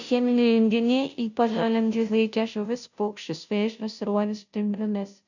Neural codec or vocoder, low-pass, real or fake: codec, 16 kHz, 0.5 kbps, FunCodec, trained on Chinese and English, 25 frames a second; 7.2 kHz; fake